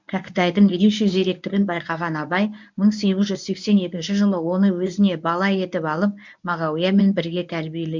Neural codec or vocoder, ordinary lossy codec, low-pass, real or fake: codec, 24 kHz, 0.9 kbps, WavTokenizer, medium speech release version 1; MP3, 64 kbps; 7.2 kHz; fake